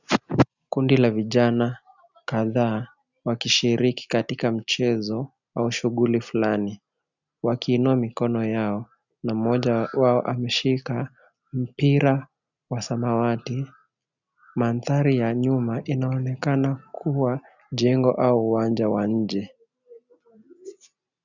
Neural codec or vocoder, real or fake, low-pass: none; real; 7.2 kHz